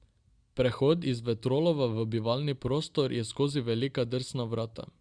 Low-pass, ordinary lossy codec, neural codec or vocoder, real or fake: 9.9 kHz; Opus, 64 kbps; none; real